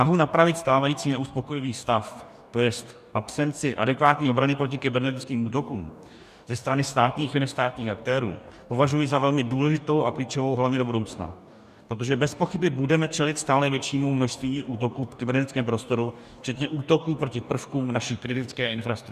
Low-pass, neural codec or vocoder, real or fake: 14.4 kHz; codec, 44.1 kHz, 2.6 kbps, DAC; fake